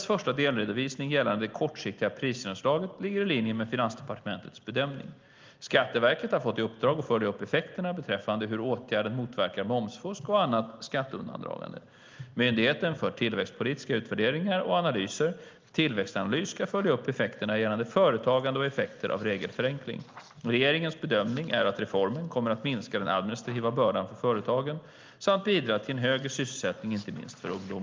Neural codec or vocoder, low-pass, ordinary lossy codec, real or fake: none; 7.2 kHz; Opus, 32 kbps; real